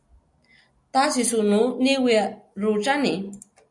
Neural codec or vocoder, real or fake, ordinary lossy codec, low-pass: none; real; MP3, 96 kbps; 10.8 kHz